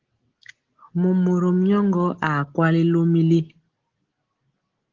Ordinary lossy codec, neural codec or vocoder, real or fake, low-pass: Opus, 16 kbps; none; real; 7.2 kHz